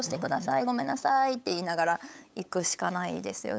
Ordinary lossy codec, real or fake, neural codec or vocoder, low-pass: none; fake; codec, 16 kHz, 8 kbps, FunCodec, trained on LibriTTS, 25 frames a second; none